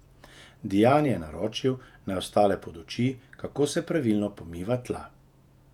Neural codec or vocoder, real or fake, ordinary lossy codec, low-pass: vocoder, 48 kHz, 128 mel bands, Vocos; fake; none; 19.8 kHz